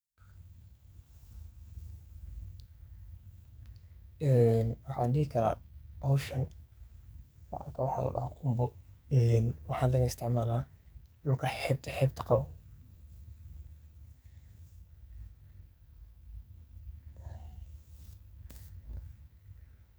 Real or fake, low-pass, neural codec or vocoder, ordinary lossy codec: fake; none; codec, 44.1 kHz, 2.6 kbps, SNAC; none